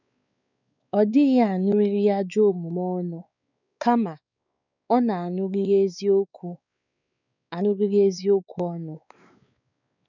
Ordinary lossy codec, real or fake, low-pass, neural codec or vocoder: none; fake; 7.2 kHz; codec, 16 kHz, 4 kbps, X-Codec, WavLM features, trained on Multilingual LibriSpeech